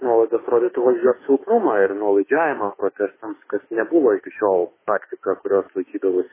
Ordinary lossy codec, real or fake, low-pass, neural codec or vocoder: MP3, 16 kbps; fake; 3.6 kHz; autoencoder, 48 kHz, 32 numbers a frame, DAC-VAE, trained on Japanese speech